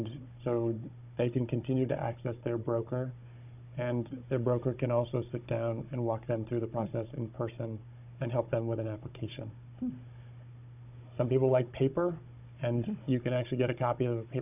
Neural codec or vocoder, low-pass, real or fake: codec, 16 kHz, 16 kbps, FunCodec, trained on Chinese and English, 50 frames a second; 3.6 kHz; fake